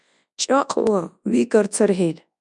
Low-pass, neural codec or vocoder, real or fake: 10.8 kHz; codec, 24 kHz, 0.9 kbps, WavTokenizer, large speech release; fake